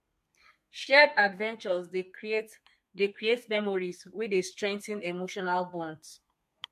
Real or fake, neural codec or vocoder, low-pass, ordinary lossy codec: fake; codec, 32 kHz, 1.9 kbps, SNAC; 14.4 kHz; MP3, 64 kbps